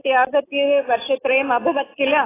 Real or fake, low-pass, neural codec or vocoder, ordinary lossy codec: real; 3.6 kHz; none; AAC, 16 kbps